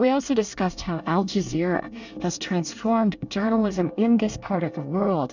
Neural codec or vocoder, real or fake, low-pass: codec, 24 kHz, 1 kbps, SNAC; fake; 7.2 kHz